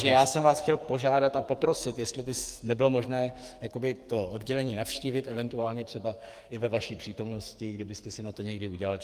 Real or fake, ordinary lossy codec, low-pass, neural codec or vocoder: fake; Opus, 32 kbps; 14.4 kHz; codec, 44.1 kHz, 2.6 kbps, SNAC